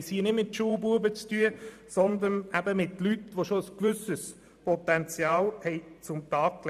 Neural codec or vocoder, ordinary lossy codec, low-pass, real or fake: vocoder, 44.1 kHz, 128 mel bands every 512 samples, BigVGAN v2; none; 14.4 kHz; fake